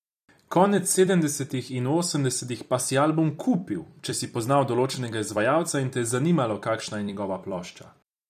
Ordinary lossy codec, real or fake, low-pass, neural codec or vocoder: none; fake; 14.4 kHz; vocoder, 44.1 kHz, 128 mel bands every 256 samples, BigVGAN v2